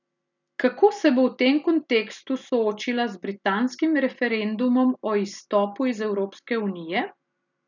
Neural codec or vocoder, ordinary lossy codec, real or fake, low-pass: none; none; real; 7.2 kHz